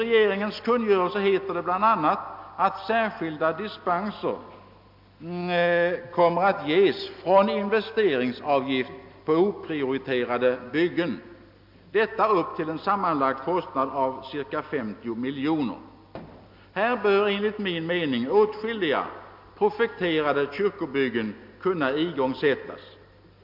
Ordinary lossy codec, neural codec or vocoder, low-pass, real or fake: MP3, 48 kbps; none; 5.4 kHz; real